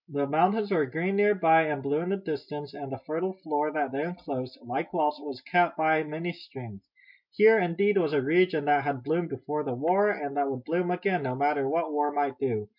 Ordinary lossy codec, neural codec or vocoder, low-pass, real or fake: MP3, 48 kbps; none; 5.4 kHz; real